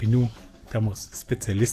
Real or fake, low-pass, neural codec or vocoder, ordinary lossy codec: fake; 14.4 kHz; codec, 44.1 kHz, 7.8 kbps, DAC; AAC, 64 kbps